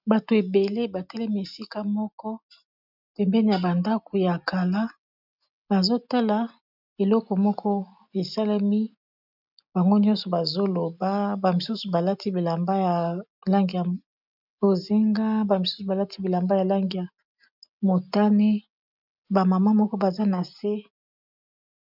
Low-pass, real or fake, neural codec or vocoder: 5.4 kHz; real; none